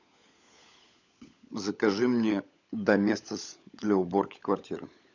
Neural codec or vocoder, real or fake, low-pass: codec, 16 kHz, 16 kbps, FunCodec, trained on LibriTTS, 50 frames a second; fake; 7.2 kHz